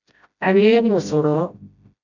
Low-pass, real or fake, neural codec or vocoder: 7.2 kHz; fake; codec, 16 kHz, 0.5 kbps, FreqCodec, smaller model